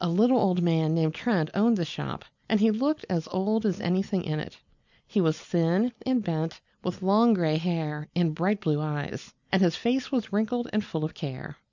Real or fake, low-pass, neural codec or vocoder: real; 7.2 kHz; none